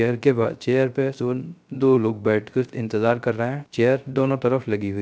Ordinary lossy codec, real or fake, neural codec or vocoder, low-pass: none; fake; codec, 16 kHz, 0.3 kbps, FocalCodec; none